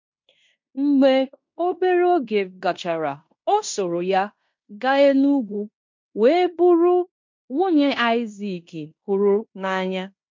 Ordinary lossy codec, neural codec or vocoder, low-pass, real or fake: MP3, 48 kbps; codec, 16 kHz in and 24 kHz out, 0.9 kbps, LongCat-Audio-Codec, fine tuned four codebook decoder; 7.2 kHz; fake